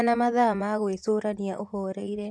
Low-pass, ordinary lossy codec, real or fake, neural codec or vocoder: none; none; fake; vocoder, 24 kHz, 100 mel bands, Vocos